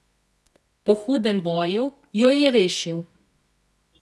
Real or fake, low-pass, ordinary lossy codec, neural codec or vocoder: fake; none; none; codec, 24 kHz, 0.9 kbps, WavTokenizer, medium music audio release